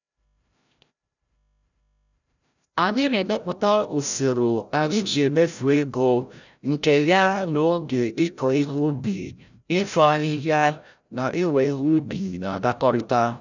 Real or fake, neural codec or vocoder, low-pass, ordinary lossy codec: fake; codec, 16 kHz, 0.5 kbps, FreqCodec, larger model; 7.2 kHz; none